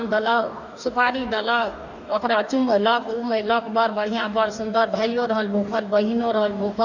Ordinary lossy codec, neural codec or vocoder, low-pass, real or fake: none; codec, 44.1 kHz, 2.6 kbps, DAC; 7.2 kHz; fake